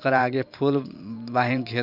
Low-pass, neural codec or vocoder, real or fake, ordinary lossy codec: 5.4 kHz; vocoder, 44.1 kHz, 128 mel bands every 512 samples, BigVGAN v2; fake; none